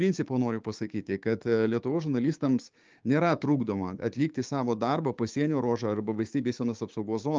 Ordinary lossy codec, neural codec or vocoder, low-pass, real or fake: Opus, 24 kbps; codec, 16 kHz, 6 kbps, DAC; 7.2 kHz; fake